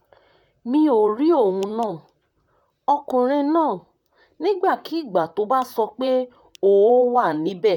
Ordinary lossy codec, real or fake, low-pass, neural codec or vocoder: none; fake; 19.8 kHz; vocoder, 44.1 kHz, 128 mel bands, Pupu-Vocoder